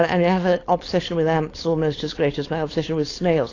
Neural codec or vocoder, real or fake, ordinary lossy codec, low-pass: codec, 16 kHz, 4.8 kbps, FACodec; fake; AAC, 32 kbps; 7.2 kHz